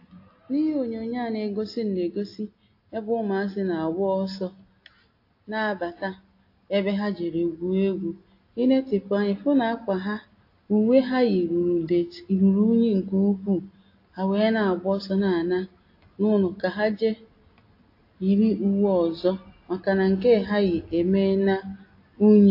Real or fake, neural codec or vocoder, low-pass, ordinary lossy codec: real; none; 5.4 kHz; AAC, 32 kbps